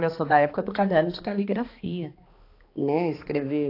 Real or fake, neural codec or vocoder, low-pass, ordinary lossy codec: fake; codec, 16 kHz, 2 kbps, X-Codec, HuBERT features, trained on balanced general audio; 5.4 kHz; AAC, 32 kbps